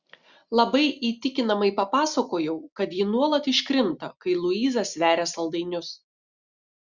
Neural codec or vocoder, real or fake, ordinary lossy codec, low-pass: none; real; Opus, 64 kbps; 7.2 kHz